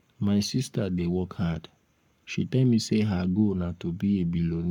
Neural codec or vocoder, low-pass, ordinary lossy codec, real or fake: codec, 44.1 kHz, 7.8 kbps, Pupu-Codec; 19.8 kHz; none; fake